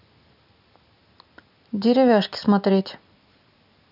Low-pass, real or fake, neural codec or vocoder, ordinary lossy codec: 5.4 kHz; real; none; none